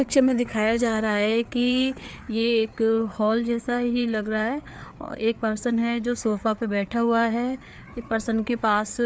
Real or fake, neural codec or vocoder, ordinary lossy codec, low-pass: fake; codec, 16 kHz, 4 kbps, FreqCodec, larger model; none; none